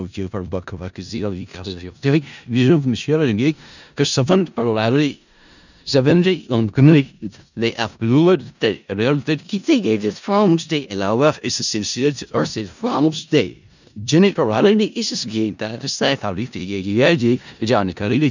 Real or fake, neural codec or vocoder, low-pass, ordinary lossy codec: fake; codec, 16 kHz in and 24 kHz out, 0.4 kbps, LongCat-Audio-Codec, four codebook decoder; 7.2 kHz; none